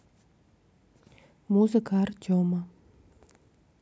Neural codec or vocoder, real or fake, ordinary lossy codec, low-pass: none; real; none; none